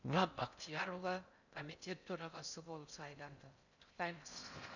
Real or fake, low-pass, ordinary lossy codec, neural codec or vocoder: fake; 7.2 kHz; none; codec, 16 kHz in and 24 kHz out, 0.6 kbps, FocalCodec, streaming, 4096 codes